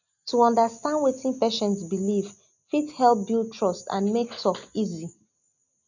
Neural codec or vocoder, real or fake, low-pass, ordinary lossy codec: none; real; 7.2 kHz; none